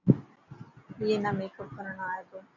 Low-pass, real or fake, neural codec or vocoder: 7.2 kHz; real; none